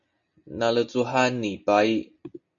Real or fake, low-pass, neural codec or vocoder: real; 7.2 kHz; none